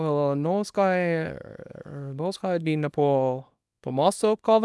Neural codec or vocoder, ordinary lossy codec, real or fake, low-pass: codec, 24 kHz, 0.9 kbps, WavTokenizer, small release; none; fake; none